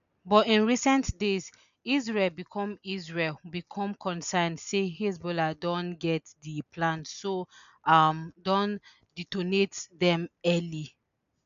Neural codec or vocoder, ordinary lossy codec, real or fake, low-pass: none; none; real; 7.2 kHz